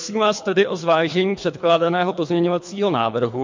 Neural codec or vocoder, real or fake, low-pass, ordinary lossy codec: codec, 24 kHz, 3 kbps, HILCodec; fake; 7.2 kHz; MP3, 48 kbps